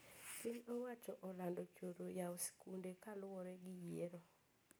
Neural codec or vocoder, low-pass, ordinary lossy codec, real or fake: none; none; none; real